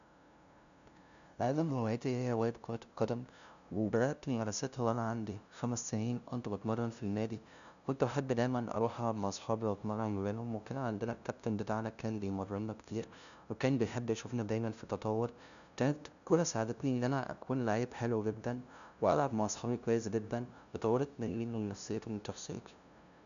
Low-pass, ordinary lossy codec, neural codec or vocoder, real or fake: 7.2 kHz; none; codec, 16 kHz, 0.5 kbps, FunCodec, trained on LibriTTS, 25 frames a second; fake